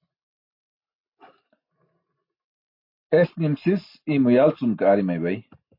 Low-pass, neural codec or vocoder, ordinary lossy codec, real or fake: 5.4 kHz; none; MP3, 32 kbps; real